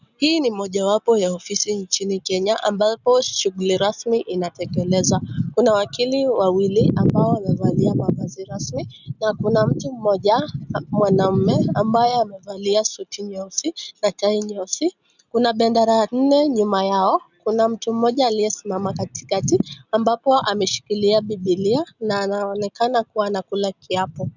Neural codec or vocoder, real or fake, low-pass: none; real; 7.2 kHz